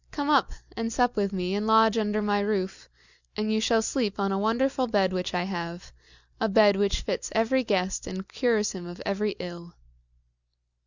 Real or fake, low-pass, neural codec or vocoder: real; 7.2 kHz; none